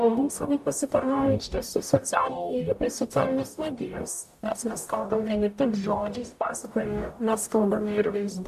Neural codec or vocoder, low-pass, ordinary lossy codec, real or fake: codec, 44.1 kHz, 0.9 kbps, DAC; 14.4 kHz; AAC, 96 kbps; fake